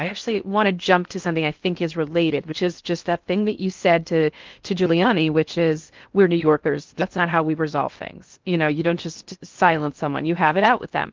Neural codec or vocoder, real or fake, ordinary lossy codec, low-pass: codec, 16 kHz in and 24 kHz out, 0.6 kbps, FocalCodec, streaming, 4096 codes; fake; Opus, 32 kbps; 7.2 kHz